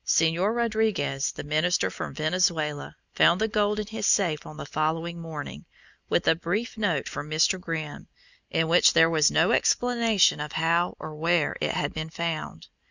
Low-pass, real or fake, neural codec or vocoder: 7.2 kHz; real; none